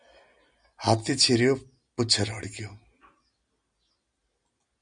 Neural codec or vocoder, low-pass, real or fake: none; 9.9 kHz; real